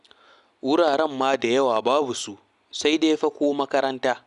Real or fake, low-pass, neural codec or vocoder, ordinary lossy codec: real; 10.8 kHz; none; none